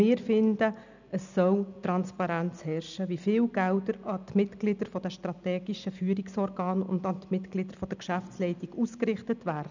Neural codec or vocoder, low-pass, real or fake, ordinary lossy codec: none; 7.2 kHz; real; none